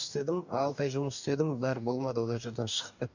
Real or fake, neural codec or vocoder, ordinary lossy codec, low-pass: fake; codec, 44.1 kHz, 2.6 kbps, DAC; none; 7.2 kHz